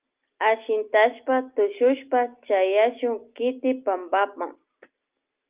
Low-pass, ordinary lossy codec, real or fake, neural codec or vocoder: 3.6 kHz; Opus, 32 kbps; real; none